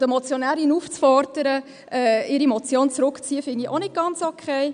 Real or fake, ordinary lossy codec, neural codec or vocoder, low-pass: real; none; none; 9.9 kHz